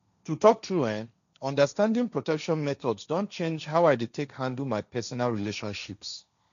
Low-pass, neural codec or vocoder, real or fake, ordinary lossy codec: 7.2 kHz; codec, 16 kHz, 1.1 kbps, Voila-Tokenizer; fake; none